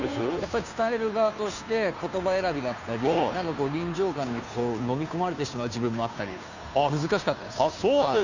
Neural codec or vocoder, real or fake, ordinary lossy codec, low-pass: codec, 16 kHz, 2 kbps, FunCodec, trained on Chinese and English, 25 frames a second; fake; none; 7.2 kHz